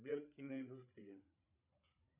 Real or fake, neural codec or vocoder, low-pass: fake; codec, 16 kHz, 8 kbps, FreqCodec, larger model; 3.6 kHz